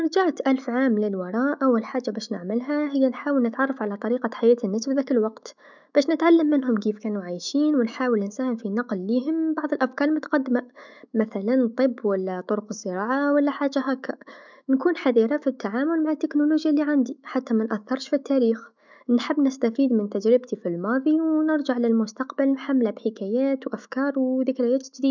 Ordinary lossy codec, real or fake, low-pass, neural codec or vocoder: none; fake; 7.2 kHz; autoencoder, 48 kHz, 128 numbers a frame, DAC-VAE, trained on Japanese speech